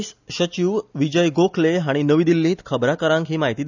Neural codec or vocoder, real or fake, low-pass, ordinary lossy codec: none; real; 7.2 kHz; none